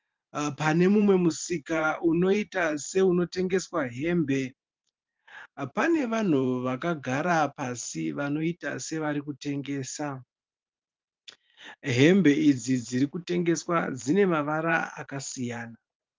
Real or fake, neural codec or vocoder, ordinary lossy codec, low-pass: fake; vocoder, 44.1 kHz, 128 mel bands every 512 samples, BigVGAN v2; Opus, 32 kbps; 7.2 kHz